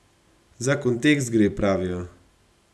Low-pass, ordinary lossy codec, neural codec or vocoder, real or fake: none; none; none; real